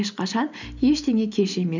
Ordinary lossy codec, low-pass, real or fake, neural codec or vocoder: none; 7.2 kHz; real; none